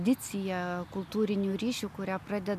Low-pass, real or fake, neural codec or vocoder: 14.4 kHz; real; none